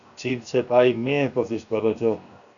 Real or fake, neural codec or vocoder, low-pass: fake; codec, 16 kHz, 0.7 kbps, FocalCodec; 7.2 kHz